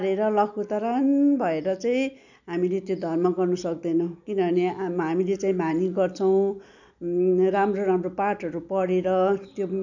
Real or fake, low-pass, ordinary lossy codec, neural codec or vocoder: real; 7.2 kHz; none; none